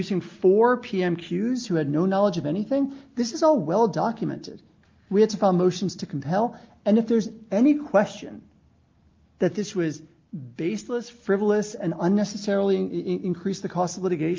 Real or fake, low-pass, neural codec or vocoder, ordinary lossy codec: real; 7.2 kHz; none; Opus, 32 kbps